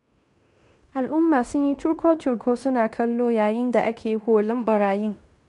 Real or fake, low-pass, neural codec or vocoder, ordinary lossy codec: fake; 10.8 kHz; codec, 16 kHz in and 24 kHz out, 0.9 kbps, LongCat-Audio-Codec, fine tuned four codebook decoder; MP3, 96 kbps